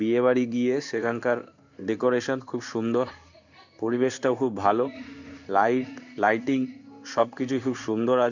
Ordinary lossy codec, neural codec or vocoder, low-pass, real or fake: none; codec, 16 kHz in and 24 kHz out, 1 kbps, XY-Tokenizer; 7.2 kHz; fake